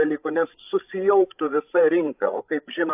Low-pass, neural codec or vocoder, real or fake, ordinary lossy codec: 3.6 kHz; codec, 16 kHz, 16 kbps, FreqCodec, larger model; fake; AAC, 32 kbps